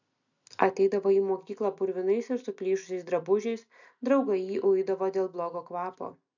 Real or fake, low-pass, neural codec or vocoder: real; 7.2 kHz; none